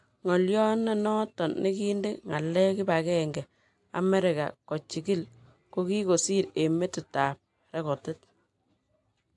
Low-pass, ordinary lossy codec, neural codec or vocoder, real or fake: 10.8 kHz; AAC, 64 kbps; none; real